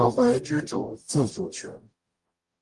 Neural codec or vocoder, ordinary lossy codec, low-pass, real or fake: codec, 44.1 kHz, 0.9 kbps, DAC; Opus, 32 kbps; 10.8 kHz; fake